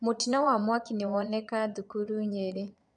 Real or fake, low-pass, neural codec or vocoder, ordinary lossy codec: fake; 9.9 kHz; vocoder, 22.05 kHz, 80 mel bands, Vocos; none